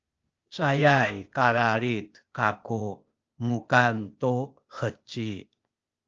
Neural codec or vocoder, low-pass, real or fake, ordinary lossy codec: codec, 16 kHz, 0.8 kbps, ZipCodec; 7.2 kHz; fake; Opus, 24 kbps